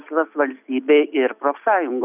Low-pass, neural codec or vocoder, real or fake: 3.6 kHz; none; real